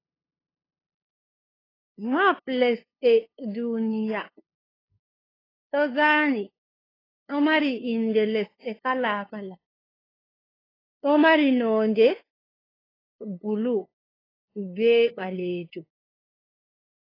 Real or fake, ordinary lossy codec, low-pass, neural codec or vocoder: fake; AAC, 24 kbps; 5.4 kHz; codec, 16 kHz, 2 kbps, FunCodec, trained on LibriTTS, 25 frames a second